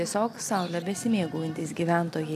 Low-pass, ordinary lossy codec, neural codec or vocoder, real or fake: 14.4 kHz; AAC, 96 kbps; none; real